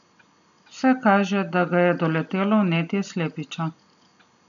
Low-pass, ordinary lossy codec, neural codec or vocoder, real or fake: 7.2 kHz; MP3, 96 kbps; none; real